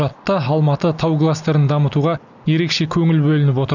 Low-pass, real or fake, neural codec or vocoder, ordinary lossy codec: 7.2 kHz; real; none; none